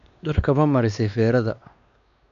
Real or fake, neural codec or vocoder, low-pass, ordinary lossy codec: fake; codec, 16 kHz, 2 kbps, X-Codec, WavLM features, trained on Multilingual LibriSpeech; 7.2 kHz; none